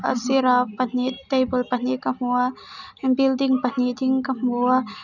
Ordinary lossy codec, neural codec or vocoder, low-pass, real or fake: none; none; 7.2 kHz; real